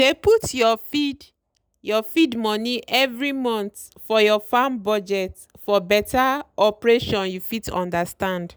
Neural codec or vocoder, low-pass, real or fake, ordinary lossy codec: none; none; real; none